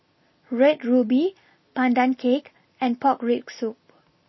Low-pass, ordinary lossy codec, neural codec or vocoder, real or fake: 7.2 kHz; MP3, 24 kbps; none; real